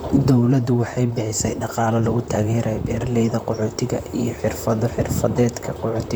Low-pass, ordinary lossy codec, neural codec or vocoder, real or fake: none; none; vocoder, 44.1 kHz, 128 mel bands, Pupu-Vocoder; fake